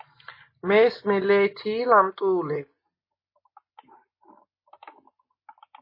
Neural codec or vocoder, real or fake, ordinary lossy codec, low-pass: none; real; MP3, 24 kbps; 5.4 kHz